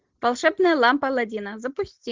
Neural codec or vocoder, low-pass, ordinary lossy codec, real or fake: none; 7.2 kHz; Opus, 32 kbps; real